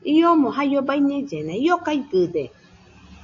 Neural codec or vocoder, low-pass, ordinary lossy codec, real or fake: none; 7.2 kHz; AAC, 48 kbps; real